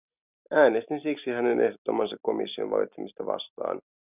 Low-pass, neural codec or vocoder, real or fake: 3.6 kHz; none; real